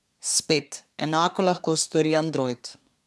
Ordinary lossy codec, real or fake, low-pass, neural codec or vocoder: none; fake; none; codec, 24 kHz, 1 kbps, SNAC